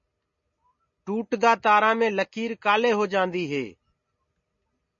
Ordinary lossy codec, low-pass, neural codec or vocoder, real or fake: MP3, 32 kbps; 7.2 kHz; none; real